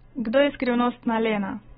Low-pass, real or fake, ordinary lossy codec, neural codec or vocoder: 7.2 kHz; real; AAC, 16 kbps; none